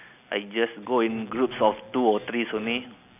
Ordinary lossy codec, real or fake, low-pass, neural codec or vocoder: none; real; 3.6 kHz; none